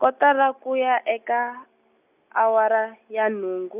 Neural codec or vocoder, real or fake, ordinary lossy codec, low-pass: none; real; none; 3.6 kHz